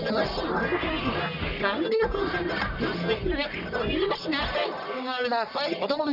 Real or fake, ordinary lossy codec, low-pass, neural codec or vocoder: fake; none; 5.4 kHz; codec, 44.1 kHz, 1.7 kbps, Pupu-Codec